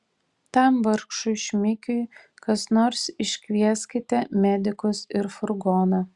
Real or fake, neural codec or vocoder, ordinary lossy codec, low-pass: real; none; Opus, 64 kbps; 10.8 kHz